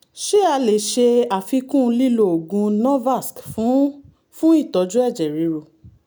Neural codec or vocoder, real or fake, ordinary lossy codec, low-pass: none; real; none; none